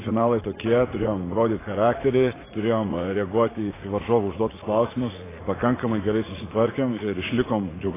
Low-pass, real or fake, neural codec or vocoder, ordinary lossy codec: 3.6 kHz; fake; vocoder, 44.1 kHz, 80 mel bands, Vocos; AAC, 16 kbps